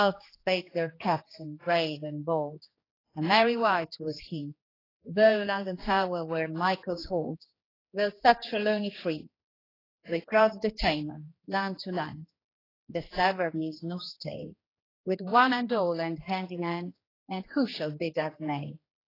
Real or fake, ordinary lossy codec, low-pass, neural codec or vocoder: fake; AAC, 24 kbps; 5.4 kHz; codec, 16 kHz, 2 kbps, X-Codec, HuBERT features, trained on general audio